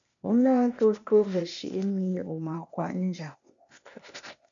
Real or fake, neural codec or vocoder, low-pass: fake; codec, 16 kHz, 0.8 kbps, ZipCodec; 7.2 kHz